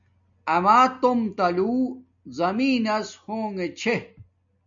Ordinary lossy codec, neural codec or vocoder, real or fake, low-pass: MP3, 64 kbps; none; real; 7.2 kHz